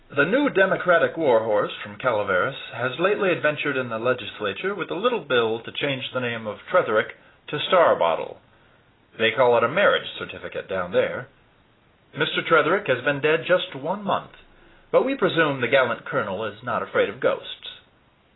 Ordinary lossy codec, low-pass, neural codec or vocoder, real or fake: AAC, 16 kbps; 7.2 kHz; none; real